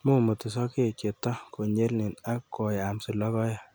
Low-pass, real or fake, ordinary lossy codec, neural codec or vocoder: none; real; none; none